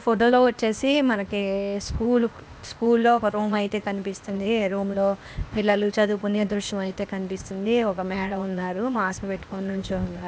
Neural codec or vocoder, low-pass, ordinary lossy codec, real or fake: codec, 16 kHz, 0.8 kbps, ZipCodec; none; none; fake